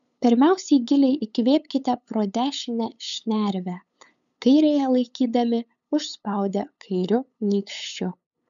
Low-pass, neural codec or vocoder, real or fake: 7.2 kHz; codec, 16 kHz, 8 kbps, FunCodec, trained on LibriTTS, 25 frames a second; fake